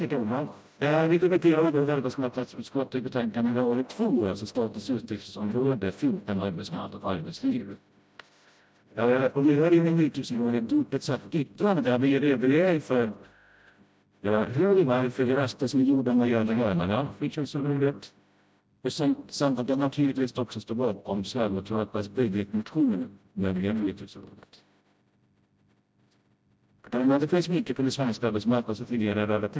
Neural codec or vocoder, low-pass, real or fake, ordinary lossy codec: codec, 16 kHz, 0.5 kbps, FreqCodec, smaller model; none; fake; none